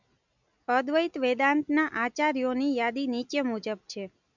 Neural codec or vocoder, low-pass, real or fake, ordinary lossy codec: none; 7.2 kHz; real; none